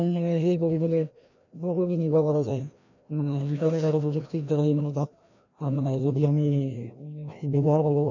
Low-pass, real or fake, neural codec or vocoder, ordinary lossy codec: 7.2 kHz; fake; codec, 16 kHz, 1 kbps, FreqCodec, larger model; none